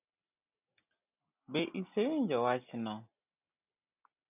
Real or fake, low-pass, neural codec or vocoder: real; 3.6 kHz; none